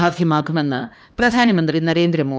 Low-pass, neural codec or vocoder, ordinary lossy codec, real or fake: none; codec, 16 kHz, 2 kbps, X-Codec, HuBERT features, trained on LibriSpeech; none; fake